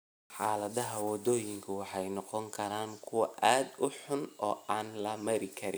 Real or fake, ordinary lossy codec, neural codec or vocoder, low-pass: real; none; none; none